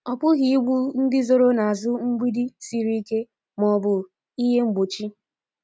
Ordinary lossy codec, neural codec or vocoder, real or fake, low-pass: none; none; real; none